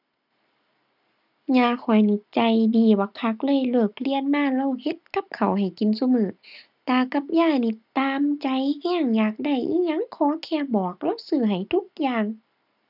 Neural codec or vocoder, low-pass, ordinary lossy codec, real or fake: none; 5.4 kHz; none; real